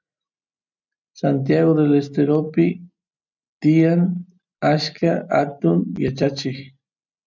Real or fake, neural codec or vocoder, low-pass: real; none; 7.2 kHz